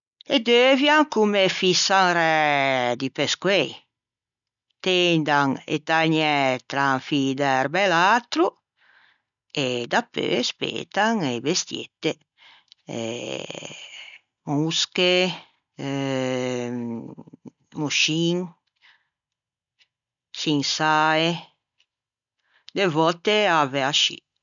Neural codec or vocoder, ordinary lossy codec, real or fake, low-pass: none; none; real; 7.2 kHz